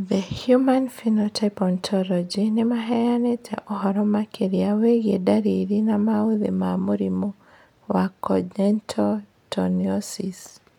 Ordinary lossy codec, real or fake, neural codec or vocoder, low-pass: none; real; none; 19.8 kHz